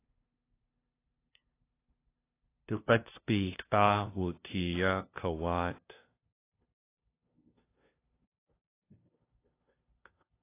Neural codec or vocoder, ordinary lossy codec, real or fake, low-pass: codec, 16 kHz, 0.5 kbps, FunCodec, trained on LibriTTS, 25 frames a second; AAC, 24 kbps; fake; 3.6 kHz